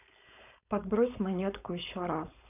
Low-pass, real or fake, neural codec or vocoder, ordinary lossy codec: 3.6 kHz; fake; codec, 16 kHz, 4.8 kbps, FACodec; none